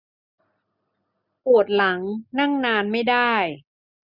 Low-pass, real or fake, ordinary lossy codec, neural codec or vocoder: 5.4 kHz; real; none; none